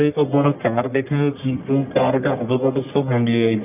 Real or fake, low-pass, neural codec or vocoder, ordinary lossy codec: fake; 3.6 kHz; codec, 44.1 kHz, 1.7 kbps, Pupu-Codec; none